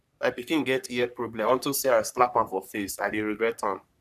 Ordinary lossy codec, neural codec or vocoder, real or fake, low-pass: none; codec, 44.1 kHz, 3.4 kbps, Pupu-Codec; fake; 14.4 kHz